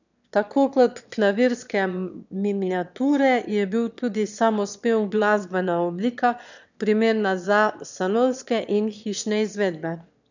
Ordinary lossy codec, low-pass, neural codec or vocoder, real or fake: none; 7.2 kHz; autoencoder, 22.05 kHz, a latent of 192 numbers a frame, VITS, trained on one speaker; fake